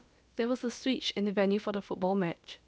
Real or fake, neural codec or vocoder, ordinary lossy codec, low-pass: fake; codec, 16 kHz, about 1 kbps, DyCAST, with the encoder's durations; none; none